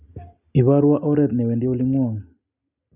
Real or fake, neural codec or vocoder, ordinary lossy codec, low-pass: real; none; none; 3.6 kHz